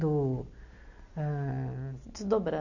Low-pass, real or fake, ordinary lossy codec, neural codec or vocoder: 7.2 kHz; real; none; none